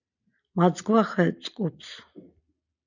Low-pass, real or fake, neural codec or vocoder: 7.2 kHz; real; none